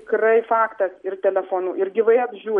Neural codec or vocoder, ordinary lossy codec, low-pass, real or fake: none; MP3, 48 kbps; 19.8 kHz; real